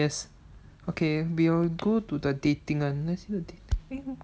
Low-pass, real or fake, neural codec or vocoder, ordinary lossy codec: none; real; none; none